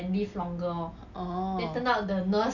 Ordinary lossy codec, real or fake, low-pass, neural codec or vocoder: none; real; 7.2 kHz; none